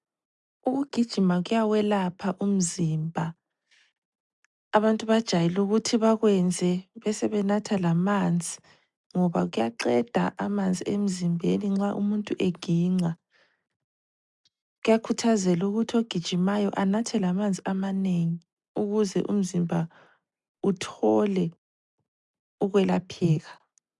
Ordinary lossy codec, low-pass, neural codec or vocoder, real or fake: MP3, 96 kbps; 10.8 kHz; none; real